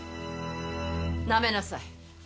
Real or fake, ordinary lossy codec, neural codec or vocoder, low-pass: real; none; none; none